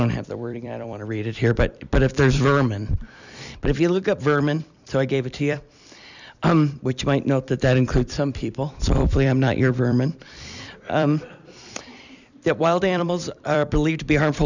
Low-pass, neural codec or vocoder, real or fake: 7.2 kHz; vocoder, 44.1 kHz, 128 mel bands every 256 samples, BigVGAN v2; fake